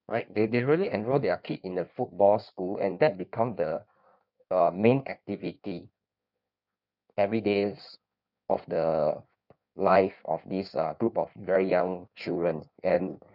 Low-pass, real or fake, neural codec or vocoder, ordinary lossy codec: 5.4 kHz; fake; codec, 16 kHz in and 24 kHz out, 1.1 kbps, FireRedTTS-2 codec; none